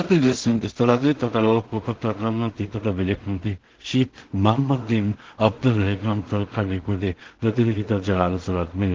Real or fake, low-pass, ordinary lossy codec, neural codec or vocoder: fake; 7.2 kHz; Opus, 16 kbps; codec, 16 kHz in and 24 kHz out, 0.4 kbps, LongCat-Audio-Codec, two codebook decoder